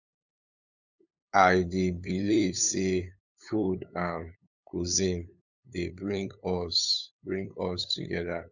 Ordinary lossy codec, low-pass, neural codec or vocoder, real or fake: none; 7.2 kHz; codec, 16 kHz, 8 kbps, FunCodec, trained on LibriTTS, 25 frames a second; fake